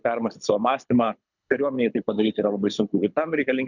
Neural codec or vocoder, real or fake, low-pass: codec, 24 kHz, 6 kbps, HILCodec; fake; 7.2 kHz